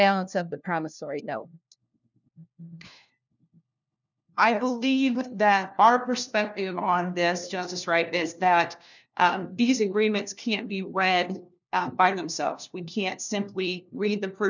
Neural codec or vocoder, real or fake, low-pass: codec, 16 kHz, 1 kbps, FunCodec, trained on LibriTTS, 50 frames a second; fake; 7.2 kHz